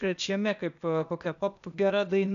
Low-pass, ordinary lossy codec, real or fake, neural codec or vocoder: 7.2 kHz; MP3, 96 kbps; fake; codec, 16 kHz, 0.8 kbps, ZipCodec